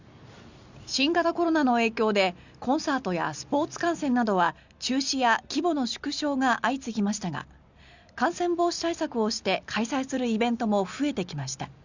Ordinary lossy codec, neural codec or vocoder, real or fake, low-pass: Opus, 64 kbps; none; real; 7.2 kHz